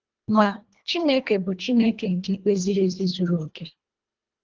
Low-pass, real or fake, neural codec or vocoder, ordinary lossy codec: 7.2 kHz; fake; codec, 24 kHz, 1.5 kbps, HILCodec; Opus, 24 kbps